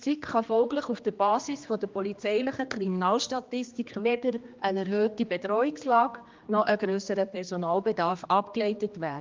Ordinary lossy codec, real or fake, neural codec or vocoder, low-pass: Opus, 24 kbps; fake; codec, 16 kHz, 2 kbps, X-Codec, HuBERT features, trained on general audio; 7.2 kHz